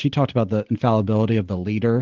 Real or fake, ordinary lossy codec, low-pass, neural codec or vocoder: real; Opus, 16 kbps; 7.2 kHz; none